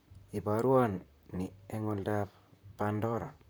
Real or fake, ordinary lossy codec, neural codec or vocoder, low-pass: fake; none; vocoder, 44.1 kHz, 128 mel bands, Pupu-Vocoder; none